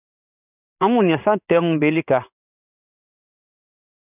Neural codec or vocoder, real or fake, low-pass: codec, 16 kHz in and 24 kHz out, 1 kbps, XY-Tokenizer; fake; 3.6 kHz